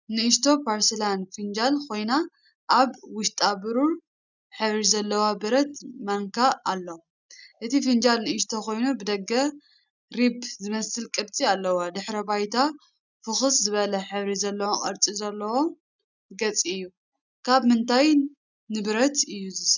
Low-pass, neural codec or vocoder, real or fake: 7.2 kHz; none; real